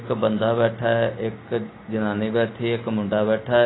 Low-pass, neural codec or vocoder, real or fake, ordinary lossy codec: 7.2 kHz; none; real; AAC, 16 kbps